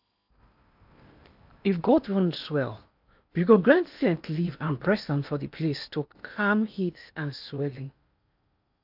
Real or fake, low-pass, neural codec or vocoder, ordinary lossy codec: fake; 5.4 kHz; codec, 16 kHz in and 24 kHz out, 0.8 kbps, FocalCodec, streaming, 65536 codes; AAC, 48 kbps